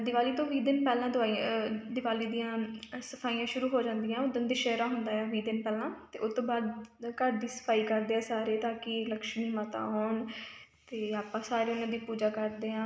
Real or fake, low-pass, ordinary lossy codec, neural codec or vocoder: real; none; none; none